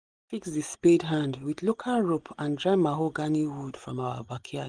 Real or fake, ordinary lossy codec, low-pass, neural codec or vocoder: real; Opus, 24 kbps; 9.9 kHz; none